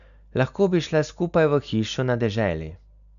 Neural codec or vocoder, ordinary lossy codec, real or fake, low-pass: none; none; real; 7.2 kHz